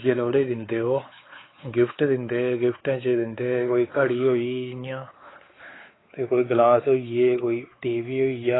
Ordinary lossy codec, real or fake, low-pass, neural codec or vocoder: AAC, 16 kbps; fake; 7.2 kHz; codec, 16 kHz, 4 kbps, X-Codec, WavLM features, trained on Multilingual LibriSpeech